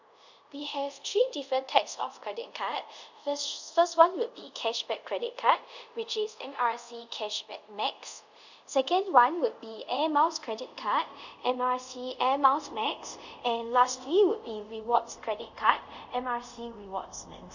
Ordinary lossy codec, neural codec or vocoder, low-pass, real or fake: none; codec, 24 kHz, 0.5 kbps, DualCodec; 7.2 kHz; fake